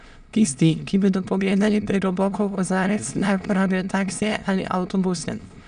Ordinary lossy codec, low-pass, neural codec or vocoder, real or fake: none; 9.9 kHz; autoencoder, 22.05 kHz, a latent of 192 numbers a frame, VITS, trained on many speakers; fake